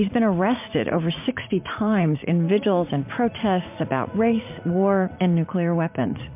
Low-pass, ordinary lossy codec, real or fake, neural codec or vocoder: 3.6 kHz; MP3, 32 kbps; fake; vocoder, 44.1 kHz, 80 mel bands, Vocos